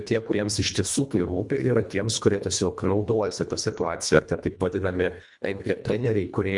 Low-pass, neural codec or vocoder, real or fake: 10.8 kHz; codec, 24 kHz, 1.5 kbps, HILCodec; fake